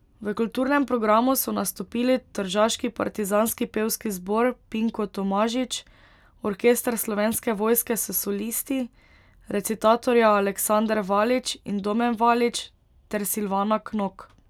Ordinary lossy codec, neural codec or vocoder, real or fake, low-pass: none; none; real; 19.8 kHz